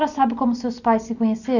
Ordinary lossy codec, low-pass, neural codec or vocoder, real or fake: none; 7.2 kHz; none; real